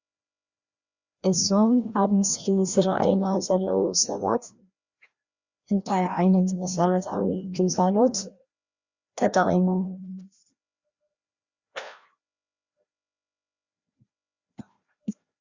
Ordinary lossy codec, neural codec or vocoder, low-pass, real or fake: Opus, 64 kbps; codec, 16 kHz, 1 kbps, FreqCodec, larger model; 7.2 kHz; fake